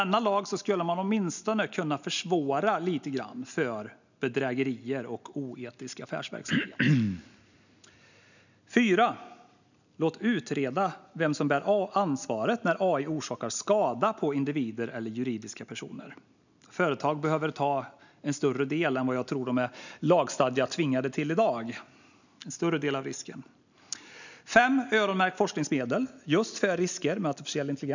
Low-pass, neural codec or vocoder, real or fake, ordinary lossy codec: 7.2 kHz; none; real; none